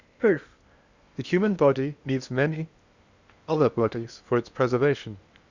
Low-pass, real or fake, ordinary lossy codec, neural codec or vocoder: 7.2 kHz; fake; Opus, 64 kbps; codec, 16 kHz in and 24 kHz out, 0.8 kbps, FocalCodec, streaming, 65536 codes